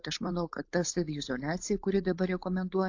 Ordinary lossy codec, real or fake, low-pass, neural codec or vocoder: AAC, 48 kbps; fake; 7.2 kHz; codec, 16 kHz, 4.8 kbps, FACodec